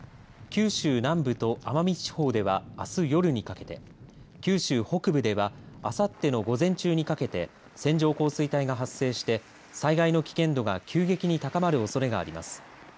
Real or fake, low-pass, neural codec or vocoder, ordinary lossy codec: real; none; none; none